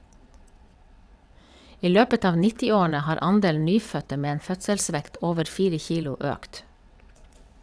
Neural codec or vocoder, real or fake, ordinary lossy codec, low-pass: vocoder, 22.05 kHz, 80 mel bands, WaveNeXt; fake; none; none